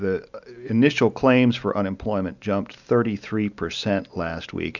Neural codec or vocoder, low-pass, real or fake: none; 7.2 kHz; real